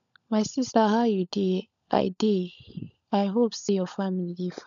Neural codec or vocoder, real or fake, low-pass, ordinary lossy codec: codec, 16 kHz, 16 kbps, FunCodec, trained on LibriTTS, 50 frames a second; fake; 7.2 kHz; none